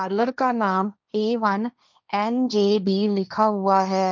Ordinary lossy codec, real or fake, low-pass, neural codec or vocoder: none; fake; none; codec, 16 kHz, 1.1 kbps, Voila-Tokenizer